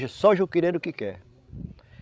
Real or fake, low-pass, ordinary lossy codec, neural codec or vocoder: fake; none; none; codec, 16 kHz, 16 kbps, FreqCodec, larger model